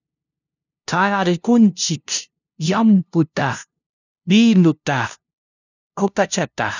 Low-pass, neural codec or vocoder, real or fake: 7.2 kHz; codec, 16 kHz, 0.5 kbps, FunCodec, trained on LibriTTS, 25 frames a second; fake